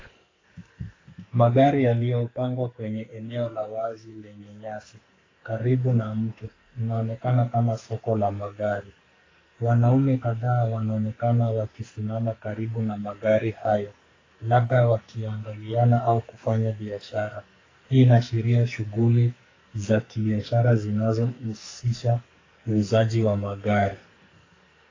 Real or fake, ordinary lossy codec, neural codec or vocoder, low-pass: fake; AAC, 32 kbps; codec, 44.1 kHz, 2.6 kbps, SNAC; 7.2 kHz